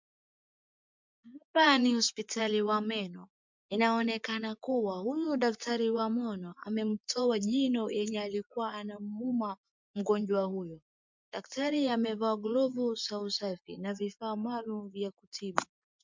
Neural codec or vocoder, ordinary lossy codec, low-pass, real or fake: vocoder, 24 kHz, 100 mel bands, Vocos; MP3, 64 kbps; 7.2 kHz; fake